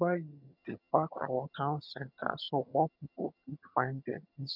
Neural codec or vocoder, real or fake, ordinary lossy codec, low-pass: vocoder, 22.05 kHz, 80 mel bands, HiFi-GAN; fake; none; 5.4 kHz